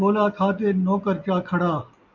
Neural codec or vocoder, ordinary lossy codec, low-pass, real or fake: none; MP3, 64 kbps; 7.2 kHz; real